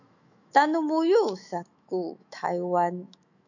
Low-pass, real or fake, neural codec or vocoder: 7.2 kHz; fake; autoencoder, 48 kHz, 128 numbers a frame, DAC-VAE, trained on Japanese speech